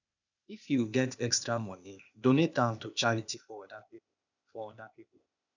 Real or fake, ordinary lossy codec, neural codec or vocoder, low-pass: fake; none; codec, 16 kHz, 0.8 kbps, ZipCodec; 7.2 kHz